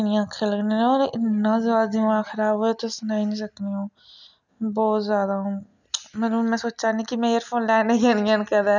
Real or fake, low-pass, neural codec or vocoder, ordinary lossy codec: real; 7.2 kHz; none; none